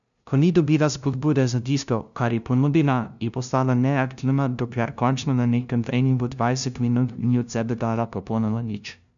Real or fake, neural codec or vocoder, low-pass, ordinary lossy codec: fake; codec, 16 kHz, 0.5 kbps, FunCodec, trained on LibriTTS, 25 frames a second; 7.2 kHz; none